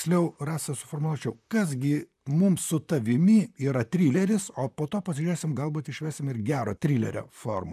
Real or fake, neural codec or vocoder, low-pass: fake; vocoder, 44.1 kHz, 128 mel bands, Pupu-Vocoder; 14.4 kHz